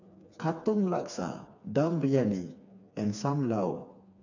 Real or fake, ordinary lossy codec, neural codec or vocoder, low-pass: fake; none; codec, 16 kHz, 4 kbps, FreqCodec, smaller model; 7.2 kHz